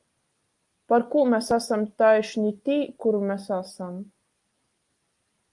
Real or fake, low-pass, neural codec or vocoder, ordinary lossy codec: real; 10.8 kHz; none; Opus, 32 kbps